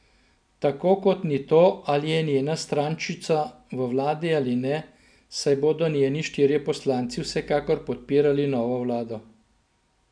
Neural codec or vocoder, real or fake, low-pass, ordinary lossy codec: none; real; 9.9 kHz; none